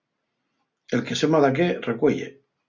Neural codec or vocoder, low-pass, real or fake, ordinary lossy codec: none; 7.2 kHz; real; Opus, 64 kbps